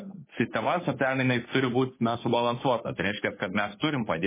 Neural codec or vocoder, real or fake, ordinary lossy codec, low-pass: codec, 16 kHz, 2 kbps, FunCodec, trained on Chinese and English, 25 frames a second; fake; MP3, 16 kbps; 3.6 kHz